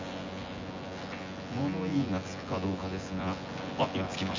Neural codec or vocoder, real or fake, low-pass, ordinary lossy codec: vocoder, 24 kHz, 100 mel bands, Vocos; fake; 7.2 kHz; MP3, 64 kbps